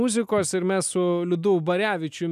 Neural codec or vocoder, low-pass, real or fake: none; 14.4 kHz; real